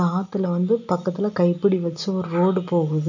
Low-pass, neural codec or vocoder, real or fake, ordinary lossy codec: 7.2 kHz; none; real; none